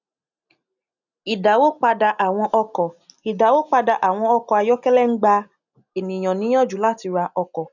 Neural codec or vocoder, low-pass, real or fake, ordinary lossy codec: none; 7.2 kHz; real; none